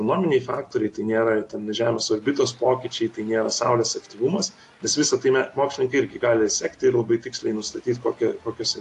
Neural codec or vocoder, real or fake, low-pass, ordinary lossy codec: none; real; 10.8 kHz; AAC, 48 kbps